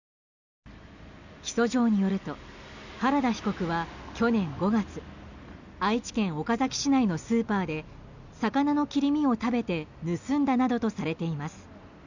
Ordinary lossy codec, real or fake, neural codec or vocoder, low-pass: none; real; none; 7.2 kHz